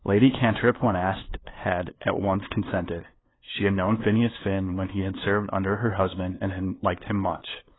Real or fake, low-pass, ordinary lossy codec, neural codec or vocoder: fake; 7.2 kHz; AAC, 16 kbps; codec, 16 kHz, 2 kbps, FunCodec, trained on LibriTTS, 25 frames a second